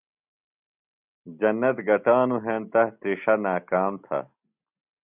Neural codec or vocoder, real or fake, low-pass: none; real; 3.6 kHz